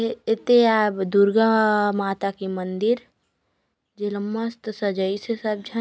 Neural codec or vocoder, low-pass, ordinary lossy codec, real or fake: none; none; none; real